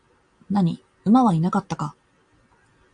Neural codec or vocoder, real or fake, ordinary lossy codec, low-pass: none; real; AAC, 64 kbps; 9.9 kHz